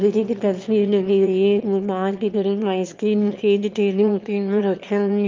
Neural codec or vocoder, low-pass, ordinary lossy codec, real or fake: autoencoder, 22.05 kHz, a latent of 192 numbers a frame, VITS, trained on one speaker; 7.2 kHz; Opus, 24 kbps; fake